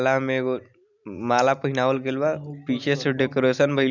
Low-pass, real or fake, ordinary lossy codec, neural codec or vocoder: 7.2 kHz; real; none; none